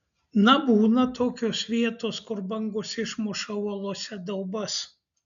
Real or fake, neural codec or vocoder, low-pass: real; none; 7.2 kHz